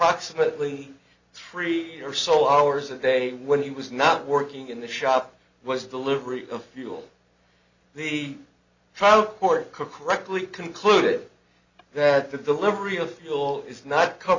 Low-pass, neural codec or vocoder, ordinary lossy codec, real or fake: 7.2 kHz; none; Opus, 64 kbps; real